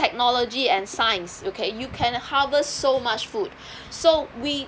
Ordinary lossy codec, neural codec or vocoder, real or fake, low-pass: none; none; real; none